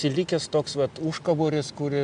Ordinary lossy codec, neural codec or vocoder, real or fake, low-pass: AAC, 96 kbps; none; real; 9.9 kHz